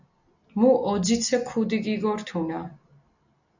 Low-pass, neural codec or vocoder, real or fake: 7.2 kHz; none; real